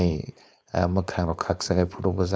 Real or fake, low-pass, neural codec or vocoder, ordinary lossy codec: fake; none; codec, 16 kHz, 4.8 kbps, FACodec; none